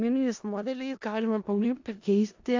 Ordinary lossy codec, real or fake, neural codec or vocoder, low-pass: AAC, 48 kbps; fake; codec, 16 kHz in and 24 kHz out, 0.4 kbps, LongCat-Audio-Codec, four codebook decoder; 7.2 kHz